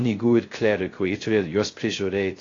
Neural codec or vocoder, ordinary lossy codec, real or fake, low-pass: codec, 16 kHz, 0.3 kbps, FocalCodec; AAC, 32 kbps; fake; 7.2 kHz